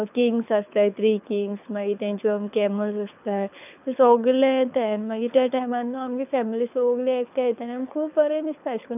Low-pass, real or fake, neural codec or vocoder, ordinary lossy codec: 3.6 kHz; fake; codec, 24 kHz, 6 kbps, HILCodec; none